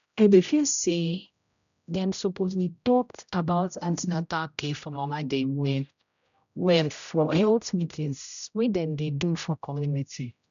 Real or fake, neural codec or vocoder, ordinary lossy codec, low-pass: fake; codec, 16 kHz, 0.5 kbps, X-Codec, HuBERT features, trained on general audio; none; 7.2 kHz